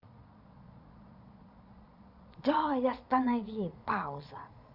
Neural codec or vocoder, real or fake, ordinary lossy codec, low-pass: vocoder, 22.05 kHz, 80 mel bands, Vocos; fake; none; 5.4 kHz